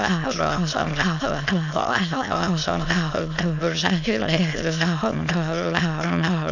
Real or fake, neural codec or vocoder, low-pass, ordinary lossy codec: fake; autoencoder, 22.05 kHz, a latent of 192 numbers a frame, VITS, trained on many speakers; 7.2 kHz; none